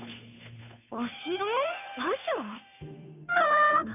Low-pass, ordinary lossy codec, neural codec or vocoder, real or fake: 3.6 kHz; none; codec, 16 kHz, 2 kbps, FunCodec, trained on Chinese and English, 25 frames a second; fake